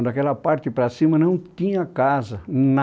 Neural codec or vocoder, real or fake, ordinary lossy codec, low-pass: none; real; none; none